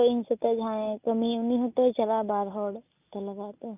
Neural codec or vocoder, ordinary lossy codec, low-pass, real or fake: none; none; 3.6 kHz; real